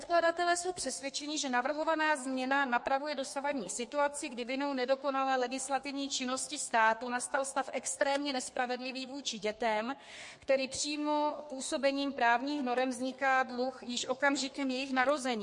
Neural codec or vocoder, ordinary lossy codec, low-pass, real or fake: codec, 32 kHz, 1.9 kbps, SNAC; MP3, 48 kbps; 10.8 kHz; fake